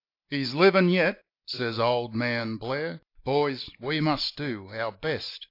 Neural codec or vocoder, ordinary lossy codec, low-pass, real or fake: none; AAC, 32 kbps; 5.4 kHz; real